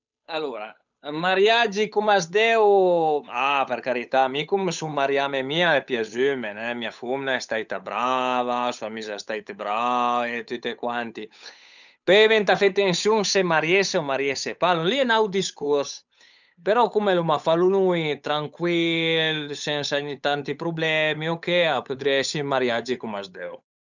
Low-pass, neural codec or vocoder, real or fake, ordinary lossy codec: 7.2 kHz; codec, 16 kHz, 8 kbps, FunCodec, trained on Chinese and English, 25 frames a second; fake; none